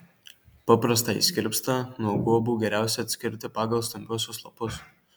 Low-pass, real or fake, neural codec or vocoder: 19.8 kHz; real; none